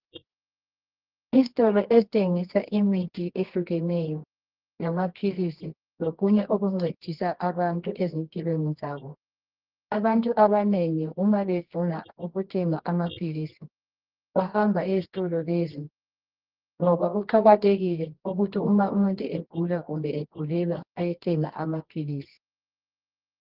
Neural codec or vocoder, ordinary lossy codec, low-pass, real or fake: codec, 24 kHz, 0.9 kbps, WavTokenizer, medium music audio release; Opus, 16 kbps; 5.4 kHz; fake